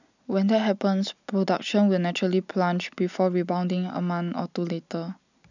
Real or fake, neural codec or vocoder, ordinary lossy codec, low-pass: real; none; none; 7.2 kHz